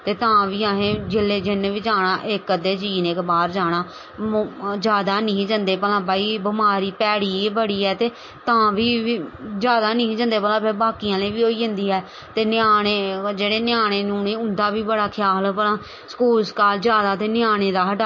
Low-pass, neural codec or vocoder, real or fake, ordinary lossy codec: 7.2 kHz; none; real; MP3, 32 kbps